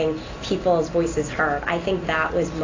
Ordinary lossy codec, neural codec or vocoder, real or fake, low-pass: AAC, 32 kbps; none; real; 7.2 kHz